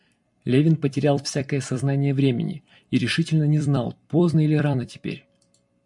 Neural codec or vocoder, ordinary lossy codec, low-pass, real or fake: vocoder, 44.1 kHz, 128 mel bands every 256 samples, BigVGAN v2; MP3, 64 kbps; 10.8 kHz; fake